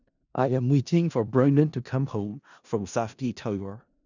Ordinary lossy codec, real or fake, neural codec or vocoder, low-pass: none; fake; codec, 16 kHz in and 24 kHz out, 0.4 kbps, LongCat-Audio-Codec, four codebook decoder; 7.2 kHz